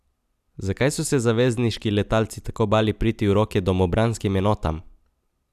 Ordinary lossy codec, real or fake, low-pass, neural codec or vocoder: none; real; 14.4 kHz; none